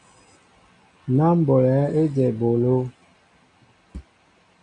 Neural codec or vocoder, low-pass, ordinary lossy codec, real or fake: none; 9.9 kHz; AAC, 48 kbps; real